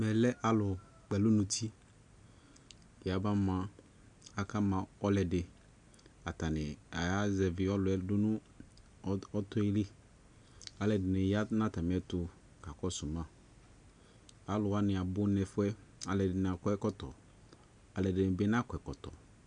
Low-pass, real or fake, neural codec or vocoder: 9.9 kHz; real; none